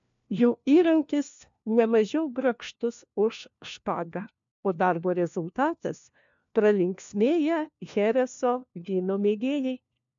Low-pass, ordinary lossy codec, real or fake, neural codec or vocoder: 7.2 kHz; MP3, 64 kbps; fake; codec, 16 kHz, 1 kbps, FunCodec, trained on LibriTTS, 50 frames a second